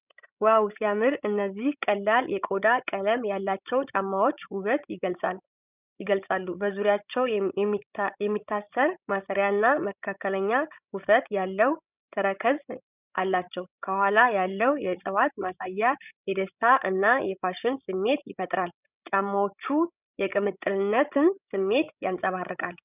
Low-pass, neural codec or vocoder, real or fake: 3.6 kHz; codec, 16 kHz, 16 kbps, FreqCodec, larger model; fake